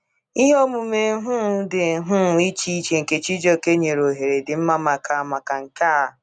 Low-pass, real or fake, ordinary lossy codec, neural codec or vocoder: 9.9 kHz; real; none; none